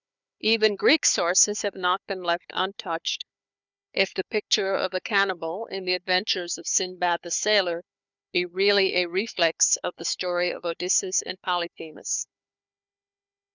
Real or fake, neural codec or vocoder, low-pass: fake; codec, 16 kHz, 4 kbps, FunCodec, trained on Chinese and English, 50 frames a second; 7.2 kHz